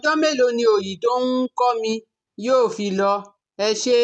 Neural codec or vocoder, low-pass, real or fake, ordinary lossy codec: none; none; real; none